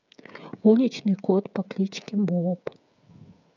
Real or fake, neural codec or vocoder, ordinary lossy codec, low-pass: fake; codec, 16 kHz, 16 kbps, FreqCodec, smaller model; none; 7.2 kHz